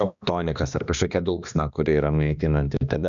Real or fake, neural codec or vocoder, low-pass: fake; codec, 16 kHz, 2 kbps, X-Codec, HuBERT features, trained on balanced general audio; 7.2 kHz